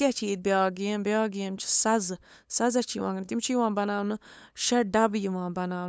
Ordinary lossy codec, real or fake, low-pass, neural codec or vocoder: none; fake; none; codec, 16 kHz, 8 kbps, FunCodec, trained on LibriTTS, 25 frames a second